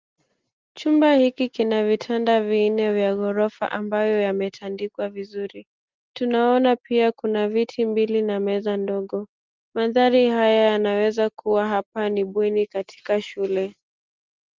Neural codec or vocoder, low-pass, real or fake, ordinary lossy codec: none; 7.2 kHz; real; Opus, 32 kbps